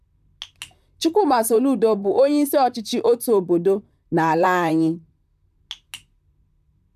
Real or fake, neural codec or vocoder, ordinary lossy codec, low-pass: fake; vocoder, 44.1 kHz, 128 mel bands, Pupu-Vocoder; none; 14.4 kHz